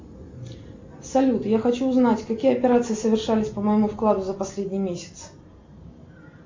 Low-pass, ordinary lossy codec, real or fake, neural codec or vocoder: 7.2 kHz; MP3, 64 kbps; real; none